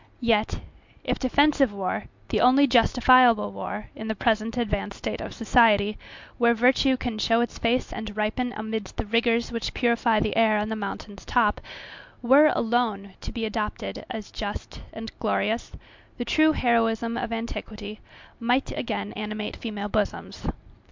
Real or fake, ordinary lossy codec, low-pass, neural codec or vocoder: real; MP3, 64 kbps; 7.2 kHz; none